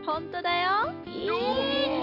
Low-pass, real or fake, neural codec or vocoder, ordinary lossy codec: 5.4 kHz; real; none; none